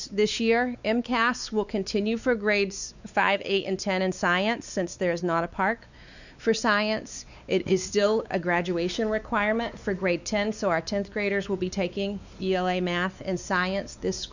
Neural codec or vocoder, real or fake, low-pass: codec, 16 kHz, 4 kbps, X-Codec, WavLM features, trained on Multilingual LibriSpeech; fake; 7.2 kHz